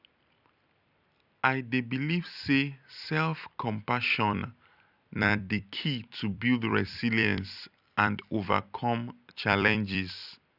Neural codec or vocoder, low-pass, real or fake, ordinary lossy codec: vocoder, 44.1 kHz, 128 mel bands every 256 samples, BigVGAN v2; 5.4 kHz; fake; none